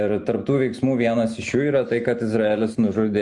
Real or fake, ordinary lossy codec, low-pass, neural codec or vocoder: real; MP3, 64 kbps; 10.8 kHz; none